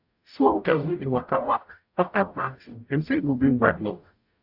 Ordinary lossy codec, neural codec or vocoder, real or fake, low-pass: AAC, 48 kbps; codec, 44.1 kHz, 0.9 kbps, DAC; fake; 5.4 kHz